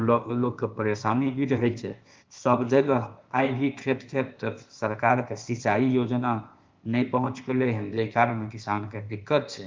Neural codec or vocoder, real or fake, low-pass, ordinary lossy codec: codec, 16 kHz, 0.8 kbps, ZipCodec; fake; 7.2 kHz; Opus, 24 kbps